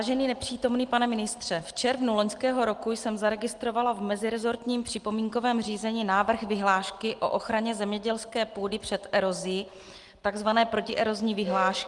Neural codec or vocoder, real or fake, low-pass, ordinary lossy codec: none; real; 10.8 kHz; Opus, 24 kbps